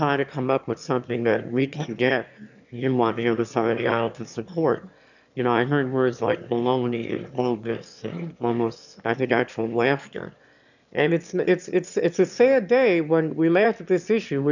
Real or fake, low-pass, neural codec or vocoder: fake; 7.2 kHz; autoencoder, 22.05 kHz, a latent of 192 numbers a frame, VITS, trained on one speaker